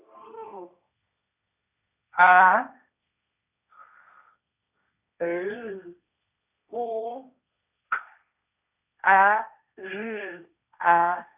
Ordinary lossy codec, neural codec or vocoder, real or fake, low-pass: none; codec, 16 kHz, 1.1 kbps, Voila-Tokenizer; fake; 3.6 kHz